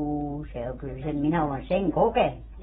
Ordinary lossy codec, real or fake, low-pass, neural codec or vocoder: AAC, 16 kbps; real; 10.8 kHz; none